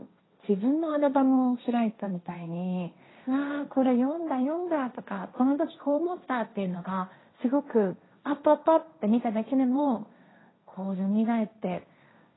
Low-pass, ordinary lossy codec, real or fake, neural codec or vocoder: 7.2 kHz; AAC, 16 kbps; fake; codec, 16 kHz, 1.1 kbps, Voila-Tokenizer